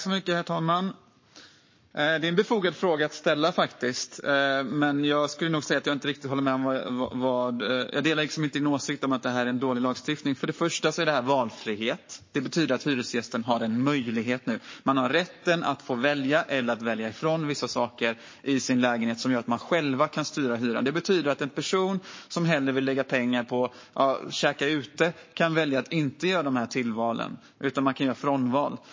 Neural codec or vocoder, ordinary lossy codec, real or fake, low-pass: codec, 44.1 kHz, 7.8 kbps, Pupu-Codec; MP3, 32 kbps; fake; 7.2 kHz